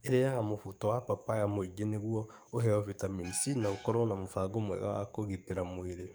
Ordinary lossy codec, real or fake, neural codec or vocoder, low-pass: none; fake; codec, 44.1 kHz, 7.8 kbps, DAC; none